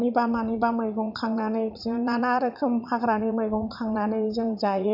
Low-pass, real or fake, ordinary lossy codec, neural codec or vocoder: 5.4 kHz; fake; none; vocoder, 44.1 kHz, 80 mel bands, Vocos